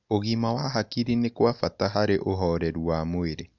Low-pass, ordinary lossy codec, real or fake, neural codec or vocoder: 7.2 kHz; none; real; none